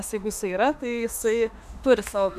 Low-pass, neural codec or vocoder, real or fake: 14.4 kHz; autoencoder, 48 kHz, 32 numbers a frame, DAC-VAE, trained on Japanese speech; fake